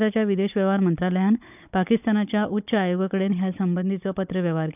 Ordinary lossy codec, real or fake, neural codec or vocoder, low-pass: none; fake; codec, 16 kHz, 16 kbps, FunCodec, trained on Chinese and English, 50 frames a second; 3.6 kHz